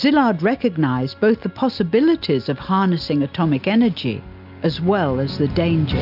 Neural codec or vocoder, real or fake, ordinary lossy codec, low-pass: none; real; AAC, 48 kbps; 5.4 kHz